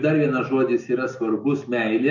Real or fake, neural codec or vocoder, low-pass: real; none; 7.2 kHz